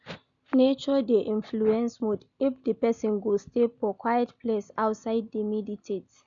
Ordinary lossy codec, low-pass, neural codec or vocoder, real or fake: none; 7.2 kHz; none; real